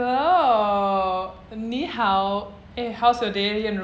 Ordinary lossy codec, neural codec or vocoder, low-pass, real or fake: none; none; none; real